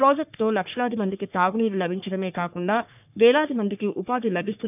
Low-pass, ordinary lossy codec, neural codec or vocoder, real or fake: 3.6 kHz; none; codec, 44.1 kHz, 3.4 kbps, Pupu-Codec; fake